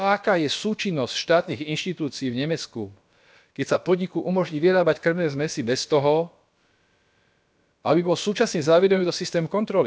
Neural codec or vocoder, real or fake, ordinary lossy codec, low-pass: codec, 16 kHz, about 1 kbps, DyCAST, with the encoder's durations; fake; none; none